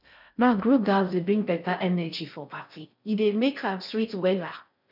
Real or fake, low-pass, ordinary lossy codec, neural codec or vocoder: fake; 5.4 kHz; none; codec, 16 kHz in and 24 kHz out, 0.6 kbps, FocalCodec, streaming, 4096 codes